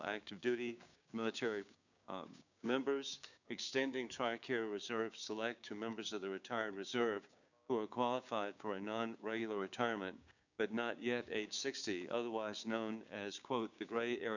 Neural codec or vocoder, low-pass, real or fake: codec, 16 kHz, 6 kbps, DAC; 7.2 kHz; fake